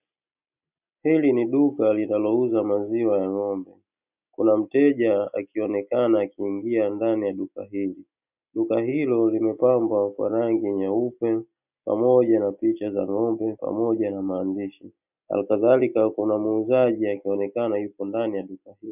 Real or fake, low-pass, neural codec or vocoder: real; 3.6 kHz; none